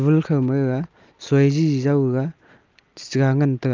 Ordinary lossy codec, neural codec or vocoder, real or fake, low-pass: Opus, 24 kbps; none; real; 7.2 kHz